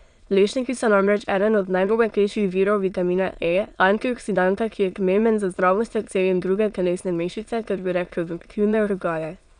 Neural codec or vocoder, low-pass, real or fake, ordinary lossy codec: autoencoder, 22.05 kHz, a latent of 192 numbers a frame, VITS, trained on many speakers; 9.9 kHz; fake; none